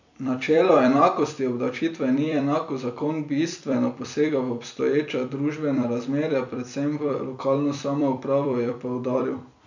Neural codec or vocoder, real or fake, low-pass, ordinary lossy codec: vocoder, 44.1 kHz, 128 mel bands every 256 samples, BigVGAN v2; fake; 7.2 kHz; none